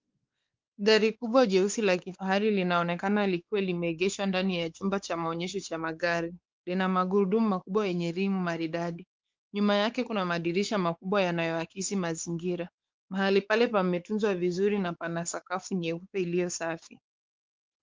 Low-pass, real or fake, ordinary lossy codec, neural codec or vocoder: 7.2 kHz; fake; Opus, 32 kbps; codec, 16 kHz, 4 kbps, X-Codec, WavLM features, trained on Multilingual LibriSpeech